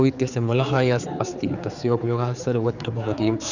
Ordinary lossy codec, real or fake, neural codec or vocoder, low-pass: none; fake; codec, 16 kHz, 4 kbps, X-Codec, HuBERT features, trained on general audio; 7.2 kHz